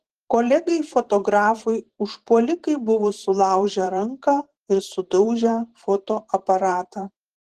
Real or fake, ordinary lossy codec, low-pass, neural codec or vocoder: fake; Opus, 16 kbps; 14.4 kHz; vocoder, 44.1 kHz, 128 mel bands every 512 samples, BigVGAN v2